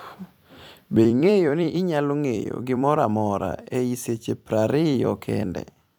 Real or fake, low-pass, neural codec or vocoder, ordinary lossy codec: fake; none; vocoder, 44.1 kHz, 128 mel bands every 256 samples, BigVGAN v2; none